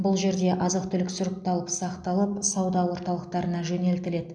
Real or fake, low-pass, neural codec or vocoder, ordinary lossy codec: real; 9.9 kHz; none; MP3, 96 kbps